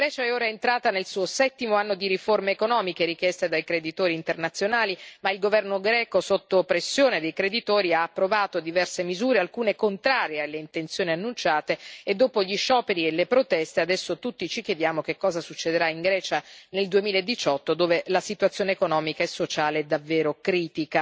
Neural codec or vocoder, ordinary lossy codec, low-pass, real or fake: none; none; none; real